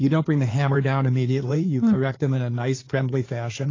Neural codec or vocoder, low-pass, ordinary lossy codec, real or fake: codec, 16 kHz in and 24 kHz out, 2.2 kbps, FireRedTTS-2 codec; 7.2 kHz; AAC, 32 kbps; fake